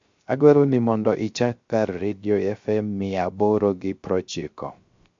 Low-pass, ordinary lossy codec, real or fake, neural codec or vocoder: 7.2 kHz; MP3, 48 kbps; fake; codec, 16 kHz, 0.3 kbps, FocalCodec